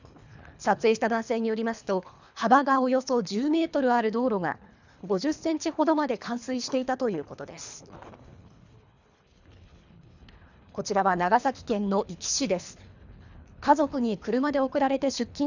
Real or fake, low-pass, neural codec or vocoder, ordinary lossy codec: fake; 7.2 kHz; codec, 24 kHz, 3 kbps, HILCodec; none